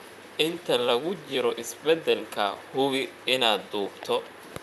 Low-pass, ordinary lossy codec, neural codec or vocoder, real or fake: 14.4 kHz; none; vocoder, 44.1 kHz, 128 mel bands, Pupu-Vocoder; fake